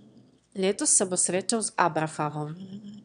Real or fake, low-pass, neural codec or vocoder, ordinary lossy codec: fake; 9.9 kHz; autoencoder, 22.05 kHz, a latent of 192 numbers a frame, VITS, trained on one speaker; none